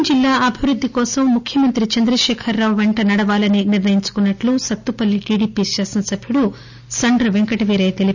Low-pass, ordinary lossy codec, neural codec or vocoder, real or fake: 7.2 kHz; none; none; real